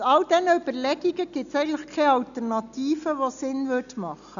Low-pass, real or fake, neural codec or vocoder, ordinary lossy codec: 7.2 kHz; real; none; none